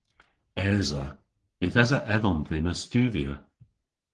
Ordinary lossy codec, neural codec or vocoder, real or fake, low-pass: Opus, 16 kbps; codec, 44.1 kHz, 3.4 kbps, Pupu-Codec; fake; 10.8 kHz